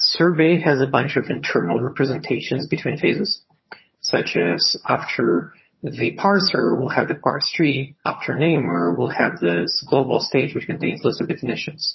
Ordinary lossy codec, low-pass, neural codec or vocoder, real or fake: MP3, 24 kbps; 7.2 kHz; vocoder, 22.05 kHz, 80 mel bands, HiFi-GAN; fake